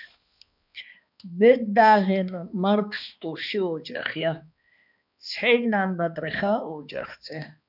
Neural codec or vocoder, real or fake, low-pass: codec, 16 kHz, 2 kbps, X-Codec, HuBERT features, trained on balanced general audio; fake; 5.4 kHz